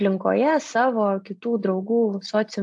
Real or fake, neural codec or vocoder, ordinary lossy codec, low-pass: real; none; AAC, 64 kbps; 10.8 kHz